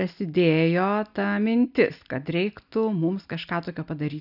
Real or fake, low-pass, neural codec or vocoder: real; 5.4 kHz; none